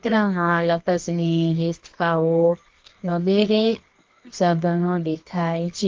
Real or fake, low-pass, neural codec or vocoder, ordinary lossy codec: fake; 7.2 kHz; codec, 24 kHz, 0.9 kbps, WavTokenizer, medium music audio release; Opus, 32 kbps